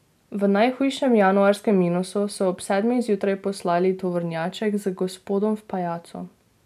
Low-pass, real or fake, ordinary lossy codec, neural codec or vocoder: 14.4 kHz; real; none; none